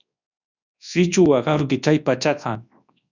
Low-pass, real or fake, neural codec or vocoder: 7.2 kHz; fake; codec, 24 kHz, 0.9 kbps, WavTokenizer, large speech release